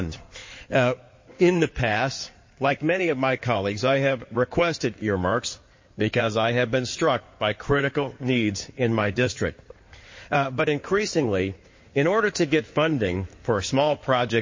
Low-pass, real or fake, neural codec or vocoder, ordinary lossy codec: 7.2 kHz; fake; codec, 16 kHz in and 24 kHz out, 2.2 kbps, FireRedTTS-2 codec; MP3, 32 kbps